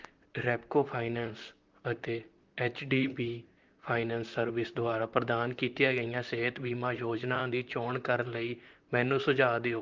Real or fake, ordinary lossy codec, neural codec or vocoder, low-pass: fake; Opus, 24 kbps; vocoder, 44.1 kHz, 128 mel bands, Pupu-Vocoder; 7.2 kHz